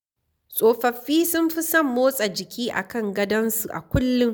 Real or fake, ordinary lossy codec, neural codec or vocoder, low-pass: real; none; none; none